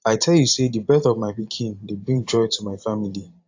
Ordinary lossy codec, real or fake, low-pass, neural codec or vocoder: AAC, 48 kbps; fake; 7.2 kHz; vocoder, 44.1 kHz, 128 mel bands every 512 samples, BigVGAN v2